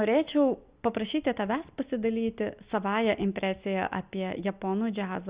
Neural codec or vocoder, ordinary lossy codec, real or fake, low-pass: none; Opus, 64 kbps; real; 3.6 kHz